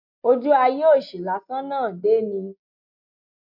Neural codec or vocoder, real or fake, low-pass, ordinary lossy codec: none; real; 5.4 kHz; MP3, 48 kbps